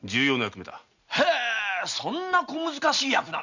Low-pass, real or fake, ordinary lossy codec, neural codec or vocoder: 7.2 kHz; real; none; none